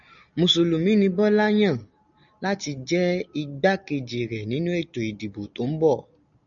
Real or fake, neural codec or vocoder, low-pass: real; none; 7.2 kHz